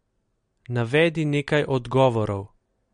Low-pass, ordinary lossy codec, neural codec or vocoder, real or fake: 9.9 kHz; MP3, 48 kbps; none; real